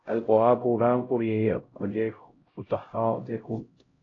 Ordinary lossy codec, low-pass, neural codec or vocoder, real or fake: AAC, 32 kbps; 7.2 kHz; codec, 16 kHz, 0.5 kbps, X-Codec, HuBERT features, trained on LibriSpeech; fake